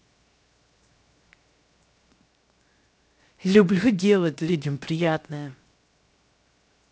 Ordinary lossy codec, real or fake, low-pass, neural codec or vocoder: none; fake; none; codec, 16 kHz, 0.7 kbps, FocalCodec